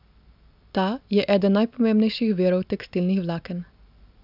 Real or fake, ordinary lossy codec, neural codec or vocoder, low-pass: real; none; none; 5.4 kHz